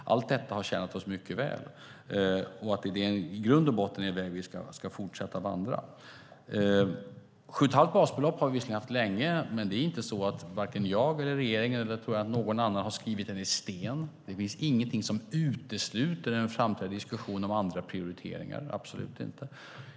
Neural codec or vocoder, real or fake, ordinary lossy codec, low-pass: none; real; none; none